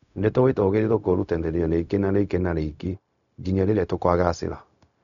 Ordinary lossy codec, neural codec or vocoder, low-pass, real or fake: none; codec, 16 kHz, 0.4 kbps, LongCat-Audio-Codec; 7.2 kHz; fake